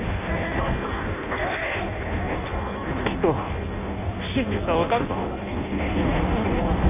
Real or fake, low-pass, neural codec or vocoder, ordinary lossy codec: fake; 3.6 kHz; codec, 16 kHz in and 24 kHz out, 0.6 kbps, FireRedTTS-2 codec; AAC, 32 kbps